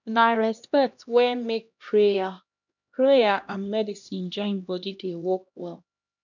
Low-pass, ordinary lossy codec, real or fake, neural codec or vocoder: 7.2 kHz; none; fake; codec, 16 kHz, 1 kbps, X-Codec, HuBERT features, trained on LibriSpeech